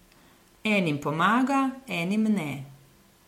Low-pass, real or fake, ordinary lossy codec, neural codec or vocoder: 19.8 kHz; real; MP3, 64 kbps; none